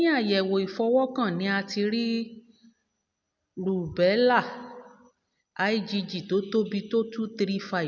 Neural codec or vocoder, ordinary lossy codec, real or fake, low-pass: none; none; real; 7.2 kHz